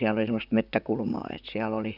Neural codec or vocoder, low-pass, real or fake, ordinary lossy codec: vocoder, 44.1 kHz, 128 mel bands, Pupu-Vocoder; 5.4 kHz; fake; none